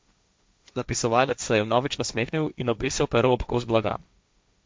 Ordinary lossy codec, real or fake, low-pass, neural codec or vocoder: none; fake; none; codec, 16 kHz, 1.1 kbps, Voila-Tokenizer